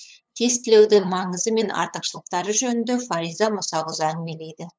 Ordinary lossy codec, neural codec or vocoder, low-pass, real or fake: none; codec, 16 kHz, 8 kbps, FunCodec, trained on LibriTTS, 25 frames a second; none; fake